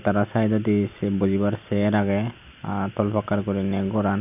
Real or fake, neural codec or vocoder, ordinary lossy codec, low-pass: real; none; none; 3.6 kHz